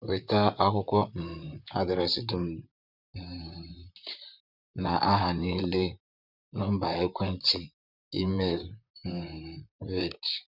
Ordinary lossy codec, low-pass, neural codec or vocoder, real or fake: none; 5.4 kHz; vocoder, 44.1 kHz, 128 mel bands, Pupu-Vocoder; fake